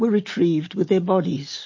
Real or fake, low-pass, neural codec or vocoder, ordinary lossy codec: real; 7.2 kHz; none; MP3, 32 kbps